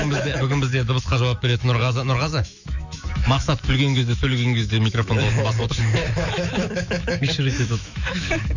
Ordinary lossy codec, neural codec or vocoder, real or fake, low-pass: none; autoencoder, 48 kHz, 128 numbers a frame, DAC-VAE, trained on Japanese speech; fake; 7.2 kHz